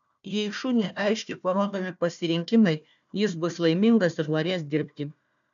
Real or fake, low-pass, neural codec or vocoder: fake; 7.2 kHz; codec, 16 kHz, 1 kbps, FunCodec, trained on Chinese and English, 50 frames a second